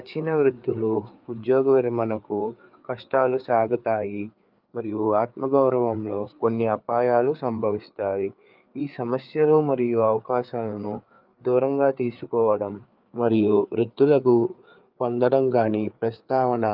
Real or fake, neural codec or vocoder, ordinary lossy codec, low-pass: fake; codec, 16 kHz, 4 kbps, FreqCodec, larger model; Opus, 24 kbps; 5.4 kHz